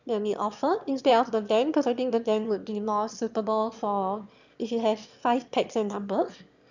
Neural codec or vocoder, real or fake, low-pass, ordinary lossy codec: autoencoder, 22.05 kHz, a latent of 192 numbers a frame, VITS, trained on one speaker; fake; 7.2 kHz; none